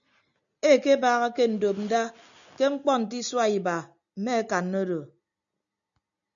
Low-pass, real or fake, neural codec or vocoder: 7.2 kHz; real; none